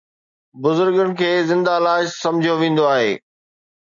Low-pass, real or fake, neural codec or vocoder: 7.2 kHz; real; none